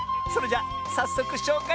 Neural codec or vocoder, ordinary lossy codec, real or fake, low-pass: none; none; real; none